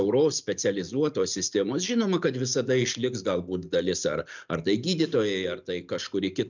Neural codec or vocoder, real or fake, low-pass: none; real; 7.2 kHz